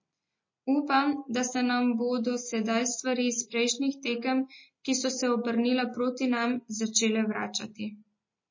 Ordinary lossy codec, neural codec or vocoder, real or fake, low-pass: MP3, 32 kbps; none; real; 7.2 kHz